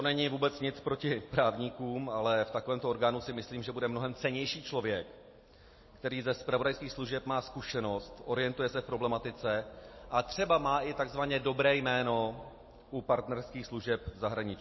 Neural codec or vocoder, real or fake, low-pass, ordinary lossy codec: none; real; 7.2 kHz; MP3, 24 kbps